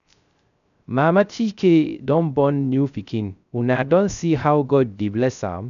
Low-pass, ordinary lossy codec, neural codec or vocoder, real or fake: 7.2 kHz; none; codec, 16 kHz, 0.3 kbps, FocalCodec; fake